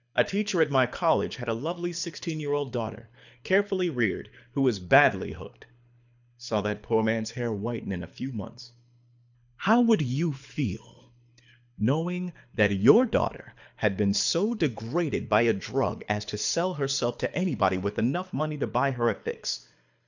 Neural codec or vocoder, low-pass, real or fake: codec, 24 kHz, 6 kbps, HILCodec; 7.2 kHz; fake